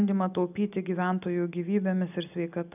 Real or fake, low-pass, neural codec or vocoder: real; 3.6 kHz; none